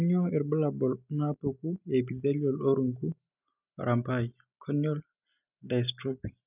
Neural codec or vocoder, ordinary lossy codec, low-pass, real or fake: none; none; 3.6 kHz; real